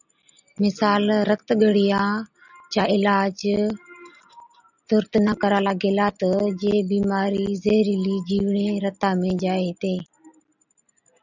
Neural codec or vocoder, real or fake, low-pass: none; real; 7.2 kHz